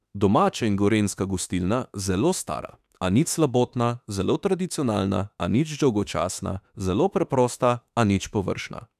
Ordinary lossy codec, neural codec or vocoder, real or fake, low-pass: none; autoencoder, 48 kHz, 32 numbers a frame, DAC-VAE, trained on Japanese speech; fake; 14.4 kHz